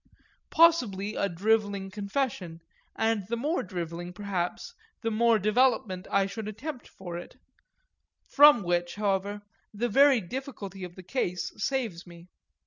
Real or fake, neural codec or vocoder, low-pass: real; none; 7.2 kHz